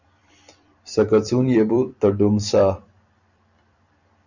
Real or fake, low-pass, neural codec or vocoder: fake; 7.2 kHz; vocoder, 44.1 kHz, 128 mel bands every 256 samples, BigVGAN v2